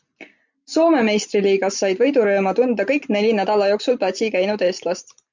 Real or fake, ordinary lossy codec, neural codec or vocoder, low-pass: real; MP3, 64 kbps; none; 7.2 kHz